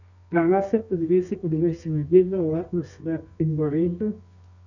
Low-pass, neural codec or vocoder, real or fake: 7.2 kHz; codec, 24 kHz, 0.9 kbps, WavTokenizer, medium music audio release; fake